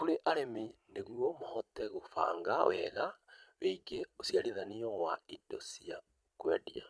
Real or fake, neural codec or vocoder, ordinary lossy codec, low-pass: fake; vocoder, 22.05 kHz, 80 mel bands, Vocos; none; none